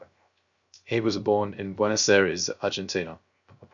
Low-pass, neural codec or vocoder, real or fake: 7.2 kHz; codec, 16 kHz, 0.3 kbps, FocalCodec; fake